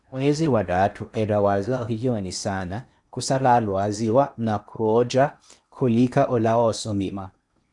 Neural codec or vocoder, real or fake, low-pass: codec, 16 kHz in and 24 kHz out, 0.8 kbps, FocalCodec, streaming, 65536 codes; fake; 10.8 kHz